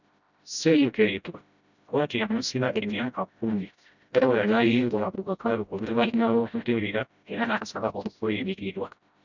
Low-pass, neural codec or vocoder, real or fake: 7.2 kHz; codec, 16 kHz, 0.5 kbps, FreqCodec, smaller model; fake